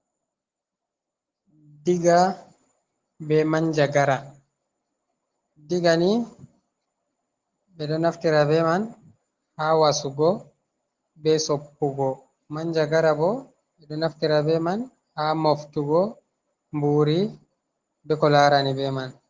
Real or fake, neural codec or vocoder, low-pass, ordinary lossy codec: real; none; 7.2 kHz; Opus, 16 kbps